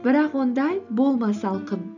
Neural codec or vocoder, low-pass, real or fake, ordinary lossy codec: none; 7.2 kHz; real; none